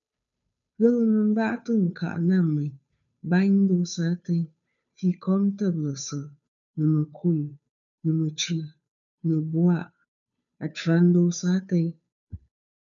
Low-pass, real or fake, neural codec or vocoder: 7.2 kHz; fake; codec, 16 kHz, 2 kbps, FunCodec, trained on Chinese and English, 25 frames a second